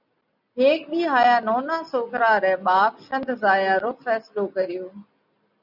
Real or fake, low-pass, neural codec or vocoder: real; 5.4 kHz; none